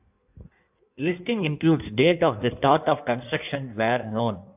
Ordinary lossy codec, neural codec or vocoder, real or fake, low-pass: AAC, 32 kbps; codec, 16 kHz in and 24 kHz out, 1.1 kbps, FireRedTTS-2 codec; fake; 3.6 kHz